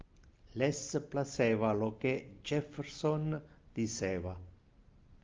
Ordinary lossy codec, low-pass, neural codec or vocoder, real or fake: Opus, 24 kbps; 7.2 kHz; none; real